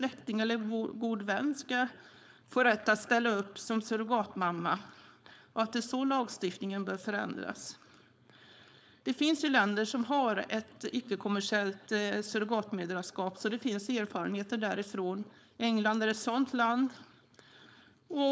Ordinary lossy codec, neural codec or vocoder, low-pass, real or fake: none; codec, 16 kHz, 4.8 kbps, FACodec; none; fake